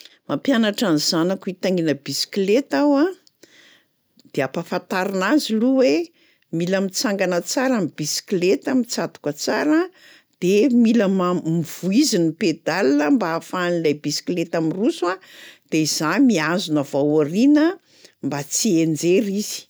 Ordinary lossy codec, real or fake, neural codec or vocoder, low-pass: none; real; none; none